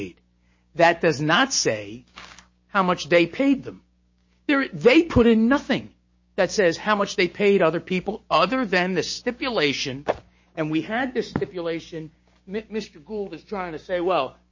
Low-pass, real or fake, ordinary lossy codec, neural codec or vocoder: 7.2 kHz; fake; MP3, 32 kbps; codec, 16 kHz, 6 kbps, DAC